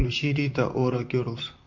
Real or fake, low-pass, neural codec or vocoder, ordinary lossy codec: fake; 7.2 kHz; vocoder, 44.1 kHz, 128 mel bands, Pupu-Vocoder; MP3, 48 kbps